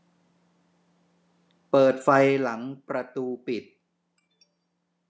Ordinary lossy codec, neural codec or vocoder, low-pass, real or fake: none; none; none; real